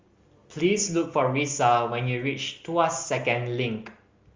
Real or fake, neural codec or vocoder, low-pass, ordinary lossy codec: real; none; 7.2 kHz; Opus, 32 kbps